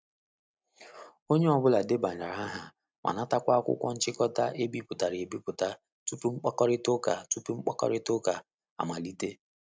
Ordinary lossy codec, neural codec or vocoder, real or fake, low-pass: none; none; real; none